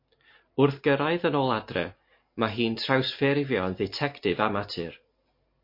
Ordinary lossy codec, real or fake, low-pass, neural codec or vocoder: MP3, 32 kbps; real; 5.4 kHz; none